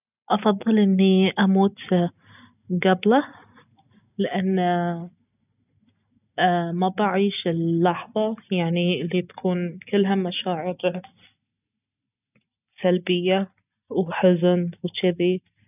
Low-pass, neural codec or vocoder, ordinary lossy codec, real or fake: 3.6 kHz; none; none; real